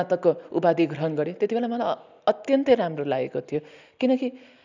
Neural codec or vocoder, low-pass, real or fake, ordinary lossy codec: none; 7.2 kHz; real; none